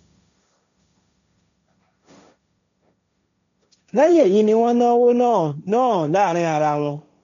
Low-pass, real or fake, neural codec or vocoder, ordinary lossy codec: 7.2 kHz; fake; codec, 16 kHz, 1.1 kbps, Voila-Tokenizer; none